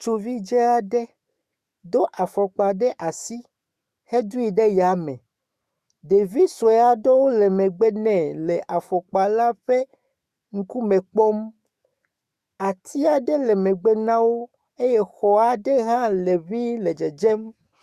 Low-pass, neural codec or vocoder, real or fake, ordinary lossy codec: 14.4 kHz; autoencoder, 48 kHz, 128 numbers a frame, DAC-VAE, trained on Japanese speech; fake; Opus, 64 kbps